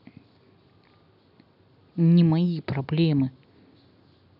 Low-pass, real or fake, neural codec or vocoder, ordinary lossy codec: 5.4 kHz; real; none; none